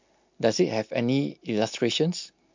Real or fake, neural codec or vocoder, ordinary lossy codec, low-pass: real; none; MP3, 48 kbps; 7.2 kHz